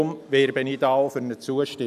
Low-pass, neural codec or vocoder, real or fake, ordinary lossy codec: 14.4 kHz; none; real; none